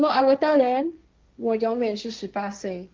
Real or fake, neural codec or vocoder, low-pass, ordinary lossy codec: fake; codec, 16 kHz, 1.1 kbps, Voila-Tokenizer; 7.2 kHz; Opus, 24 kbps